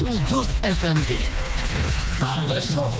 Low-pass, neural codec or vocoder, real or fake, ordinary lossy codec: none; codec, 16 kHz, 2 kbps, FreqCodec, smaller model; fake; none